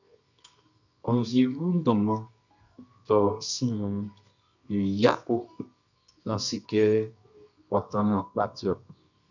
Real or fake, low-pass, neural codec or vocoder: fake; 7.2 kHz; codec, 24 kHz, 0.9 kbps, WavTokenizer, medium music audio release